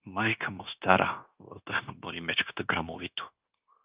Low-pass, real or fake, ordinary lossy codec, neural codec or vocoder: 3.6 kHz; fake; Opus, 32 kbps; codec, 16 kHz, 0.9 kbps, LongCat-Audio-Codec